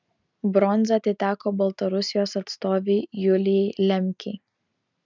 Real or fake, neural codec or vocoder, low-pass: real; none; 7.2 kHz